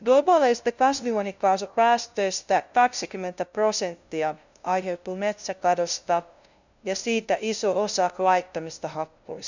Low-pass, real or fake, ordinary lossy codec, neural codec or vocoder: 7.2 kHz; fake; none; codec, 16 kHz, 0.5 kbps, FunCodec, trained on LibriTTS, 25 frames a second